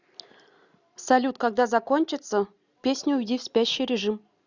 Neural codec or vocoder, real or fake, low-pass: none; real; 7.2 kHz